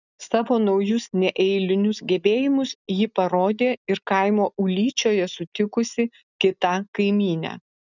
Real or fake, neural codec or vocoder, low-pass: real; none; 7.2 kHz